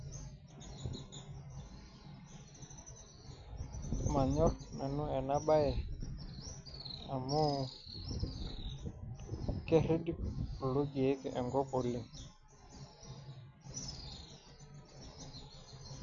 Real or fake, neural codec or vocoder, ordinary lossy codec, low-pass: real; none; none; 7.2 kHz